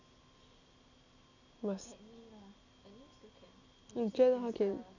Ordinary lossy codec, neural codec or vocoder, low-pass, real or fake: none; none; 7.2 kHz; real